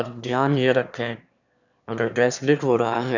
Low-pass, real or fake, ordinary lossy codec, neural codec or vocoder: 7.2 kHz; fake; none; autoencoder, 22.05 kHz, a latent of 192 numbers a frame, VITS, trained on one speaker